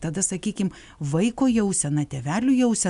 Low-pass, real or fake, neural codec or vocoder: 10.8 kHz; real; none